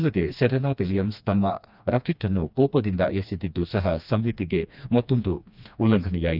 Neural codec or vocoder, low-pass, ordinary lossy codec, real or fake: codec, 16 kHz, 2 kbps, FreqCodec, smaller model; 5.4 kHz; none; fake